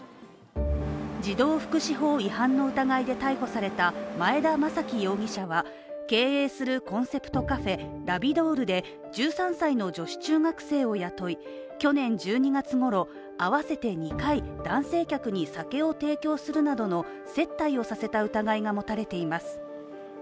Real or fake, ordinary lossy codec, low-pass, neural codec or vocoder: real; none; none; none